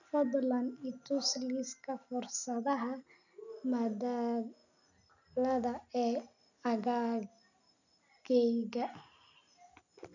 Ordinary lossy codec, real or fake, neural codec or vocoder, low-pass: none; real; none; 7.2 kHz